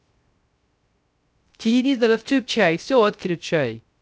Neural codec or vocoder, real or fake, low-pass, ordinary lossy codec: codec, 16 kHz, 0.3 kbps, FocalCodec; fake; none; none